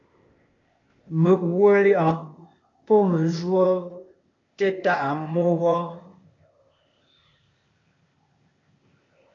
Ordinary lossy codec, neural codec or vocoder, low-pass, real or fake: AAC, 32 kbps; codec, 16 kHz, 0.8 kbps, ZipCodec; 7.2 kHz; fake